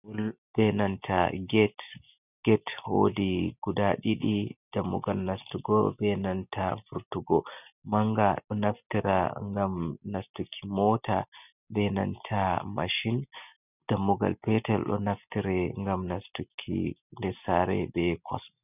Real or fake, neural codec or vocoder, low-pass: real; none; 3.6 kHz